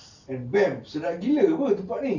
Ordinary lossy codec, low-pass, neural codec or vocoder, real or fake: none; 7.2 kHz; none; real